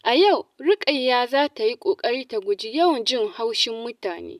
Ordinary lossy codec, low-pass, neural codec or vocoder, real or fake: none; 14.4 kHz; none; real